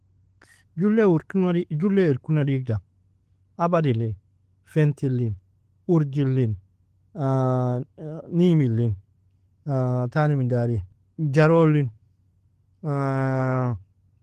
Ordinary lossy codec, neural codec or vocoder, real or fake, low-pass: Opus, 16 kbps; none; real; 14.4 kHz